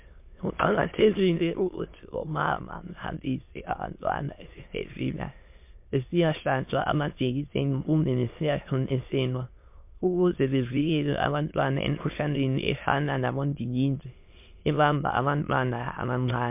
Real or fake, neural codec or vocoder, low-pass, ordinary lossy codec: fake; autoencoder, 22.05 kHz, a latent of 192 numbers a frame, VITS, trained on many speakers; 3.6 kHz; MP3, 32 kbps